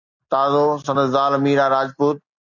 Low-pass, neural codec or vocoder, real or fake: 7.2 kHz; none; real